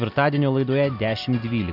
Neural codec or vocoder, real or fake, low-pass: none; real; 5.4 kHz